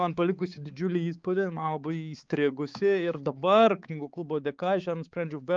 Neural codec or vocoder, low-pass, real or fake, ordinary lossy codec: codec, 16 kHz, 4 kbps, X-Codec, HuBERT features, trained on balanced general audio; 7.2 kHz; fake; Opus, 32 kbps